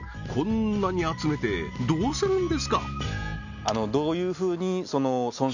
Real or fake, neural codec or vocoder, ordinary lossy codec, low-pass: real; none; none; 7.2 kHz